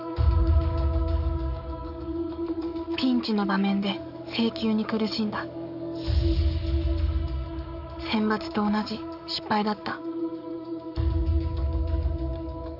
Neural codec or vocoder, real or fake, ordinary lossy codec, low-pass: codec, 44.1 kHz, 7.8 kbps, DAC; fake; none; 5.4 kHz